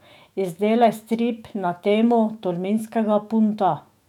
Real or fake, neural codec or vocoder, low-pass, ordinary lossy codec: fake; autoencoder, 48 kHz, 128 numbers a frame, DAC-VAE, trained on Japanese speech; 19.8 kHz; none